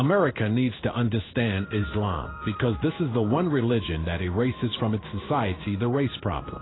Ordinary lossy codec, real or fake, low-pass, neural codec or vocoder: AAC, 16 kbps; fake; 7.2 kHz; codec, 16 kHz in and 24 kHz out, 1 kbps, XY-Tokenizer